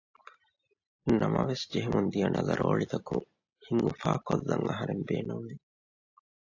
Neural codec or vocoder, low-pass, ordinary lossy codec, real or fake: none; 7.2 kHz; AAC, 48 kbps; real